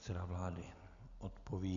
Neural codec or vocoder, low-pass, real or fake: none; 7.2 kHz; real